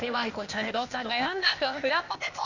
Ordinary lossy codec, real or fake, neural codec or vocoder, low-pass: none; fake; codec, 16 kHz, 0.8 kbps, ZipCodec; 7.2 kHz